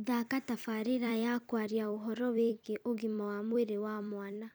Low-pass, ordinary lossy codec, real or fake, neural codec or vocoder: none; none; fake; vocoder, 44.1 kHz, 128 mel bands every 256 samples, BigVGAN v2